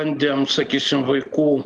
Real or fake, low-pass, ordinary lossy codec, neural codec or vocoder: real; 9.9 kHz; Opus, 32 kbps; none